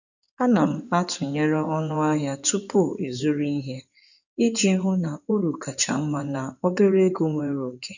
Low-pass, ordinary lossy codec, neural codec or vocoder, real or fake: 7.2 kHz; none; codec, 16 kHz in and 24 kHz out, 2.2 kbps, FireRedTTS-2 codec; fake